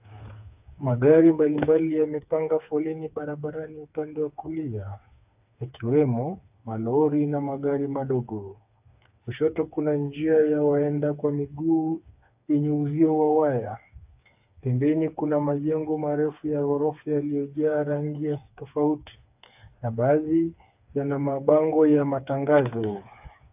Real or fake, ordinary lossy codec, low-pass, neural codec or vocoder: fake; AAC, 32 kbps; 3.6 kHz; codec, 16 kHz, 4 kbps, FreqCodec, smaller model